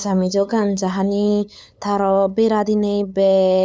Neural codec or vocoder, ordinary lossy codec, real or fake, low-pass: codec, 16 kHz, 8 kbps, FunCodec, trained on LibriTTS, 25 frames a second; none; fake; none